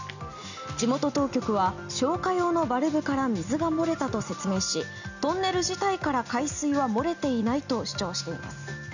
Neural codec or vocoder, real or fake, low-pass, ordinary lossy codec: none; real; 7.2 kHz; none